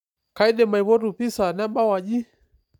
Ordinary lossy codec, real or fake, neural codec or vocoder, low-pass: none; real; none; 19.8 kHz